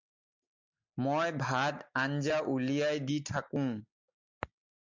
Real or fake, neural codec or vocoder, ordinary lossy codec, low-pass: real; none; MP3, 64 kbps; 7.2 kHz